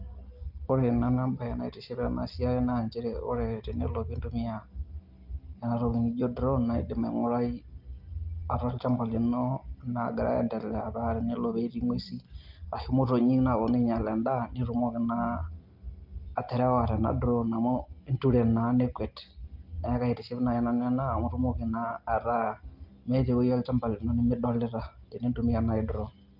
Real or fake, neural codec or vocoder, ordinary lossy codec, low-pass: real; none; Opus, 32 kbps; 5.4 kHz